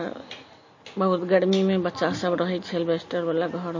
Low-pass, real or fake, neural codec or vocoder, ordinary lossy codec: 7.2 kHz; real; none; MP3, 32 kbps